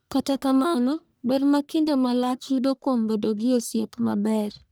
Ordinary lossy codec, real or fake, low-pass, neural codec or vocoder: none; fake; none; codec, 44.1 kHz, 1.7 kbps, Pupu-Codec